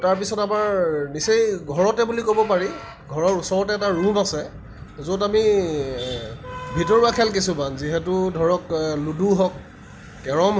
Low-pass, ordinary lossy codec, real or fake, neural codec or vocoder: none; none; real; none